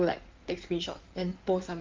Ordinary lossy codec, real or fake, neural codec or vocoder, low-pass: Opus, 32 kbps; fake; codec, 16 kHz in and 24 kHz out, 2.2 kbps, FireRedTTS-2 codec; 7.2 kHz